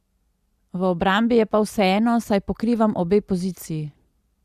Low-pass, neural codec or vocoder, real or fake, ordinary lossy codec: 14.4 kHz; none; real; Opus, 64 kbps